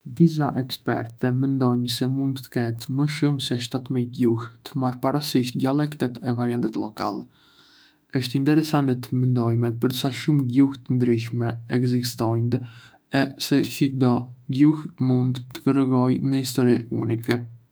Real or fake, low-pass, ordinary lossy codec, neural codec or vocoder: fake; none; none; autoencoder, 48 kHz, 32 numbers a frame, DAC-VAE, trained on Japanese speech